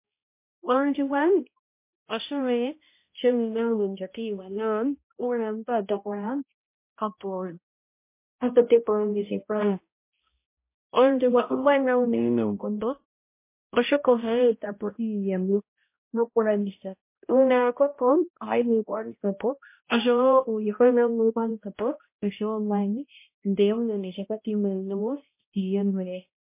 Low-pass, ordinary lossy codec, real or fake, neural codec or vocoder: 3.6 kHz; MP3, 24 kbps; fake; codec, 16 kHz, 0.5 kbps, X-Codec, HuBERT features, trained on balanced general audio